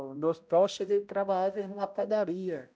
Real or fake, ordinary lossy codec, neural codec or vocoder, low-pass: fake; none; codec, 16 kHz, 0.5 kbps, X-Codec, HuBERT features, trained on balanced general audio; none